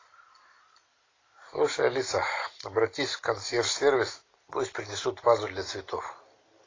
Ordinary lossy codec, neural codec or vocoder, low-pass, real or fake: AAC, 32 kbps; none; 7.2 kHz; real